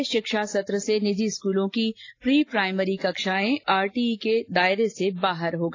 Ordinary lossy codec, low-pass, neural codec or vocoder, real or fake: AAC, 32 kbps; 7.2 kHz; none; real